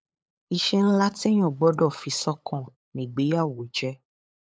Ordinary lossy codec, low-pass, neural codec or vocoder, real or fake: none; none; codec, 16 kHz, 8 kbps, FunCodec, trained on LibriTTS, 25 frames a second; fake